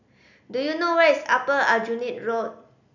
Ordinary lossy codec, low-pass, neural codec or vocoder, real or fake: none; 7.2 kHz; none; real